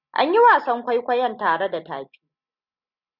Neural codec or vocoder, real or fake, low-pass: none; real; 5.4 kHz